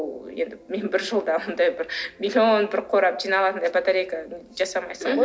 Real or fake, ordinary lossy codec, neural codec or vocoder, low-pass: real; none; none; none